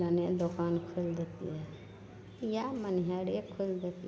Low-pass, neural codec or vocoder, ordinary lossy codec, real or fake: none; none; none; real